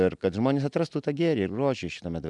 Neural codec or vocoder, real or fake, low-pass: none; real; 10.8 kHz